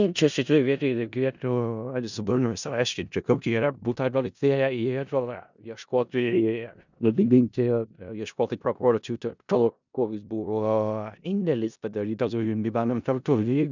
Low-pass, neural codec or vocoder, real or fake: 7.2 kHz; codec, 16 kHz in and 24 kHz out, 0.4 kbps, LongCat-Audio-Codec, four codebook decoder; fake